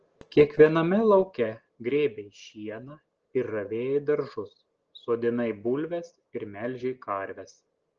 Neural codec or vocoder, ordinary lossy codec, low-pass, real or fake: none; Opus, 16 kbps; 7.2 kHz; real